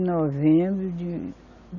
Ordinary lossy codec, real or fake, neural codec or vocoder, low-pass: none; real; none; 7.2 kHz